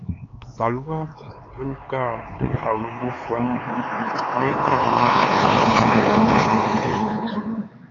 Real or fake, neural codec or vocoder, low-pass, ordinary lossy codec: fake; codec, 16 kHz, 4 kbps, X-Codec, HuBERT features, trained on LibriSpeech; 7.2 kHz; AAC, 32 kbps